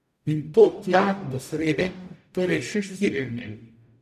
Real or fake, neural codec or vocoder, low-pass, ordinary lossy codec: fake; codec, 44.1 kHz, 0.9 kbps, DAC; 14.4 kHz; none